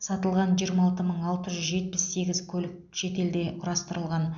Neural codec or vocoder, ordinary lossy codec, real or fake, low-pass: none; none; real; 7.2 kHz